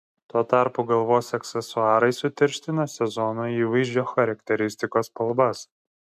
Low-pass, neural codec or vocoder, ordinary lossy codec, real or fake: 14.4 kHz; none; MP3, 96 kbps; real